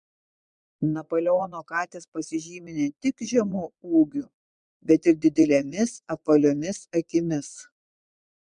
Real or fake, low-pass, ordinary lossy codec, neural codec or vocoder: fake; 9.9 kHz; MP3, 96 kbps; vocoder, 22.05 kHz, 80 mel bands, Vocos